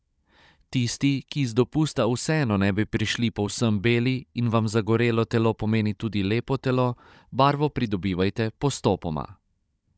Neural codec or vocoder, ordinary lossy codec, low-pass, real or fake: codec, 16 kHz, 16 kbps, FunCodec, trained on Chinese and English, 50 frames a second; none; none; fake